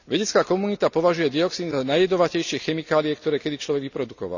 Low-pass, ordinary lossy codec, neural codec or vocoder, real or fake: 7.2 kHz; none; none; real